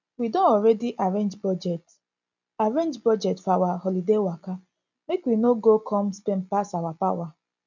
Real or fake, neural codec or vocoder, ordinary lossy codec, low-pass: real; none; none; 7.2 kHz